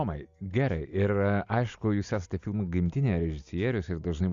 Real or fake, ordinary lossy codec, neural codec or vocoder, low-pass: real; Opus, 64 kbps; none; 7.2 kHz